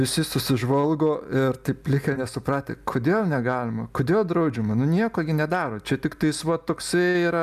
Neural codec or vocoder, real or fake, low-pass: none; real; 14.4 kHz